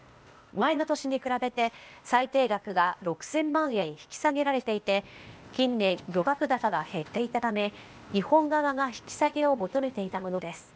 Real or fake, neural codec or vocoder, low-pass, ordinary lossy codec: fake; codec, 16 kHz, 0.8 kbps, ZipCodec; none; none